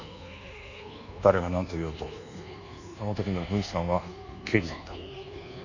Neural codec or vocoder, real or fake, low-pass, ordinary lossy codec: codec, 24 kHz, 1.2 kbps, DualCodec; fake; 7.2 kHz; none